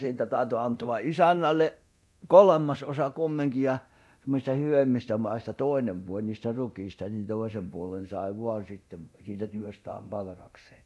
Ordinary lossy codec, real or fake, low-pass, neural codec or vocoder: none; fake; none; codec, 24 kHz, 0.9 kbps, DualCodec